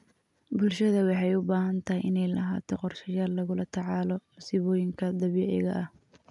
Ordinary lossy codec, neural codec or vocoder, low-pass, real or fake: none; none; 10.8 kHz; real